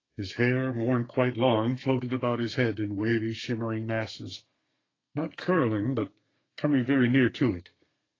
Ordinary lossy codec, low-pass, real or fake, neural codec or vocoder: AAC, 32 kbps; 7.2 kHz; fake; codec, 32 kHz, 1.9 kbps, SNAC